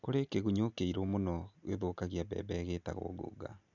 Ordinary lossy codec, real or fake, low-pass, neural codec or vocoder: none; real; 7.2 kHz; none